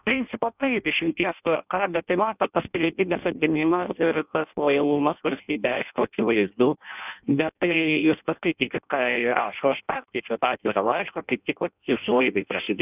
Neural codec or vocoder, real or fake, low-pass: codec, 16 kHz in and 24 kHz out, 0.6 kbps, FireRedTTS-2 codec; fake; 3.6 kHz